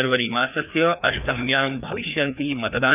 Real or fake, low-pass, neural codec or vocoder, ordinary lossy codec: fake; 3.6 kHz; codec, 16 kHz, 2 kbps, FreqCodec, larger model; none